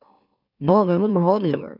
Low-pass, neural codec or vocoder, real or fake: 5.4 kHz; autoencoder, 44.1 kHz, a latent of 192 numbers a frame, MeloTTS; fake